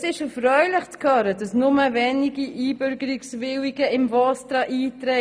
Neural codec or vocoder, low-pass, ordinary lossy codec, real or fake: none; none; none; real